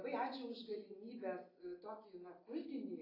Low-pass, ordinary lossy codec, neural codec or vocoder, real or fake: 5.4 kHz; AAC, 24 kbps; none; real